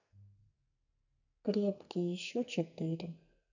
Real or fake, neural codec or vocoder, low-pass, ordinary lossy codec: fake; codec, 44.1 kHz, 2.6 kbps, SNAC; 7.2 kHz; none